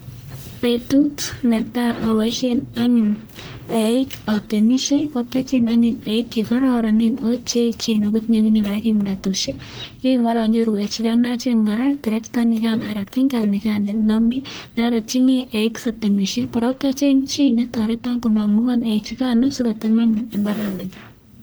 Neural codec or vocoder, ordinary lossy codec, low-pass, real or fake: codec, 44.1 kHz, 1.7 kbps, Pupu-Codec; none; none; fake